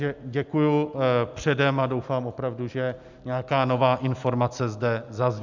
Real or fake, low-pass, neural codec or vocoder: real; 7.2 kHz; none